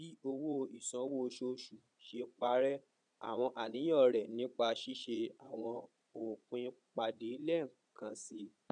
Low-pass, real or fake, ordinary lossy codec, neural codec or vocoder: 9.9 kHz; fake; none; vocoder, 22.05 kHz, 80 mel bands, Vocos